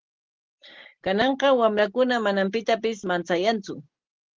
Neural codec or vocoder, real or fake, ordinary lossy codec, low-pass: none; real; Opus, 16 kbps; 7.2 kHz